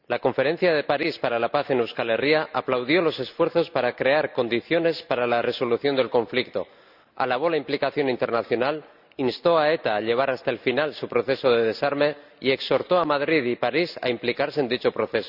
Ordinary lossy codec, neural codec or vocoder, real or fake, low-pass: none; none; real; 5.4 kHz